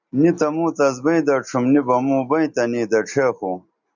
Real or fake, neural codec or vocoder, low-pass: real; none; 7.2 kHz